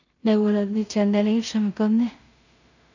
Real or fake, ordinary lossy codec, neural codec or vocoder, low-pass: fake; none; codec, 16 kHz in and 24 kHz out, 0.4 kbps, LongCat-Audio-Codec, two codebook decoder; 7.2 kHz